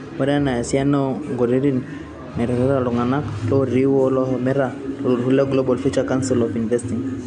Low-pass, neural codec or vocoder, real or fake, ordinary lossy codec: 9.9 kHz; none; real; MP3, 64 kbps